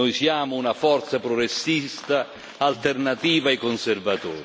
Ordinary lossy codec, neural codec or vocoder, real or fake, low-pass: none; none; real; none